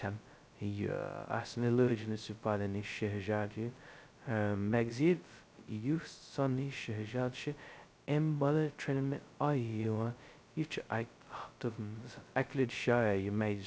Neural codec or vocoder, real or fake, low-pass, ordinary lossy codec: codec, 16 kHz, 0.2 kbps, FocalCodec; fake; none; none